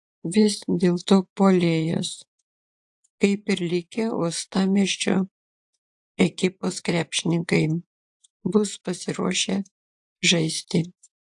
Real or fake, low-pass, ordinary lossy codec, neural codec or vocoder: fake; 10.8 kHz; AAC, 64 kbps; vocoder, 24 kHz, 100 mel bands, Vocos